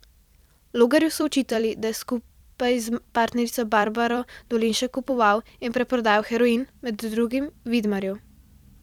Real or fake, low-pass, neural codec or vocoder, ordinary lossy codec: fake; 19.8 kHz; vocoder, 44.1 kHz, 128 mel bands every 256 samples, BigVGAN v2; none